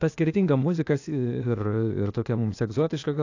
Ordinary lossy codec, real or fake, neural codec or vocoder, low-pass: AAC, 48 kbps; fake; codec, 16 kHz, 0.8 kbps, ZipCodec; 7.2 kHz